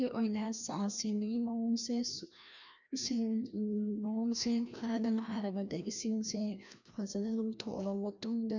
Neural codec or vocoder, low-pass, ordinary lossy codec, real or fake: codec, 16 kHz, 1 kbps, FreqCodec, larger model; 7.2 kHz; none; fake